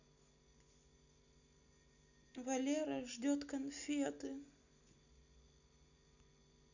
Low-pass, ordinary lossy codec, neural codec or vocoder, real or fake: 7.2 kHz; none; none; real